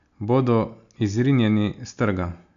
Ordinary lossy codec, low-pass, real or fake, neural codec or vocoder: AAC, 96 kbps; 7.2 kHz; real; none